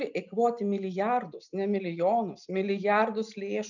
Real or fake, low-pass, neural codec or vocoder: real; 7.2 kHz; none